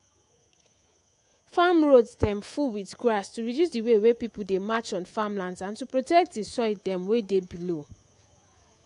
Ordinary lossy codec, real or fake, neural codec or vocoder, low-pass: AAC, 48 kbps; fake; codec, 24 kHz, 3.1 kbps, DualCodec; 10.8 kHz